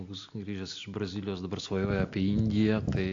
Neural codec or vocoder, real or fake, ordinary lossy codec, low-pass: none; real; AAC, 48 kbps; 7.2 kHz